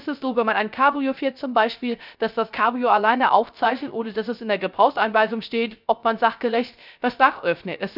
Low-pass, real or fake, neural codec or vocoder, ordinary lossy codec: 5.4 kHz; fake; codec, 16 kHz, 0.3 kbps, FocalCodec; none